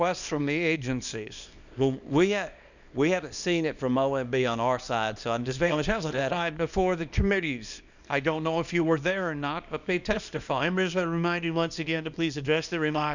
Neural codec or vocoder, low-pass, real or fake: codec, 24 kHz, 0.9 kbps, WavTokenizer, small release; 7.2 kHz; fake